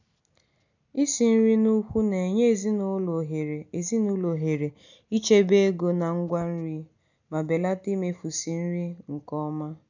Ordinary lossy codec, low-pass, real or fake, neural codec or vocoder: none; 7.2 kHz; real; none